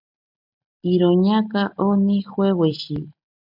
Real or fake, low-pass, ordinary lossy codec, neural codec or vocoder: real; 5.4 kHz; MP3, 48 kbps; none